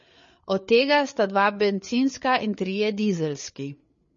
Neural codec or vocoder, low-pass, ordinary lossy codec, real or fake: codec, 16 kHz, 8 kbps, FreqCodec, larger model; 7.2 kHz; MP3, 32 kbps; fake